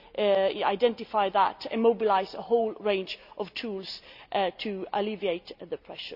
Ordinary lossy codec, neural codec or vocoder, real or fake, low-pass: none; none; real; 5.4 kHz